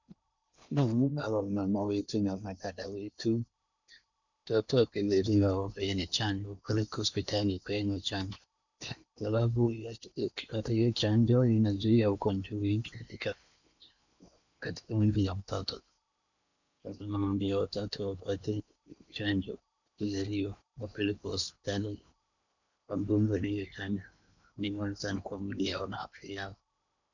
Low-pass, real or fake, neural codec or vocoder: 7.2 kHz; fake; codec, 16 kHz in and 24 kHz out, 0.8 kbps, FocalCodec, streaming, 65536 codes